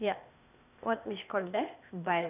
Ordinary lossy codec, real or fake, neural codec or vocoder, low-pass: none; fake; codec, 16 kHz, 0.8 kbps, ZipCodec; 3.6 kHz